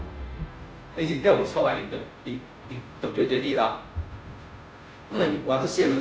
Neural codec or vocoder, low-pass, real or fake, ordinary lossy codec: codec, 16 kHz, 0.5 kbps, FunCodec, trained on Chinese and English, 25 frames a second; none; fake; none